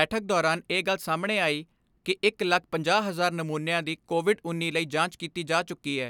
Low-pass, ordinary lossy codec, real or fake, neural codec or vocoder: 19.8 kHz; none; real; none